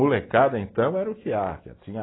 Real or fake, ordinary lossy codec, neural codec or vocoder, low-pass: real; AAC, 16 kbps; none; 7.2 kHz